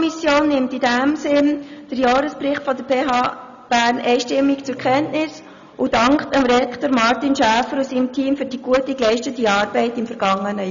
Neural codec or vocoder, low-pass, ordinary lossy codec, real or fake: none; 7.2 kHz; none; real